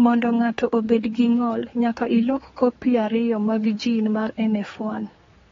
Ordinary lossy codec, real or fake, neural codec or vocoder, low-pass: AAC, 24 kbps; fake; codec, 16 kHz, 4 kbps, X-Codec, HuBERT features, trained on general audio; 7.2 kHz